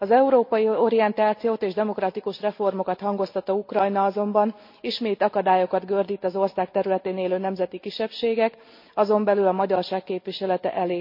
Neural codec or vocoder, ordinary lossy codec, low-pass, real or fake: none; MP3, 32 kbps; 5.4 kHz; real